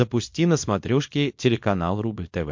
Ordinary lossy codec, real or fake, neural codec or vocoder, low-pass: MP3, 48 kbps; fake; codec, 24 kHz, 0.9 kbps, WavTokenizer, small release; 7.2 kHz